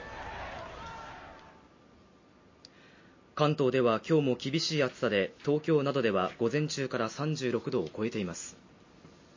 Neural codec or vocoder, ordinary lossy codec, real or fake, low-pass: none; MP3, 32 kbps; real; 7.2 kHz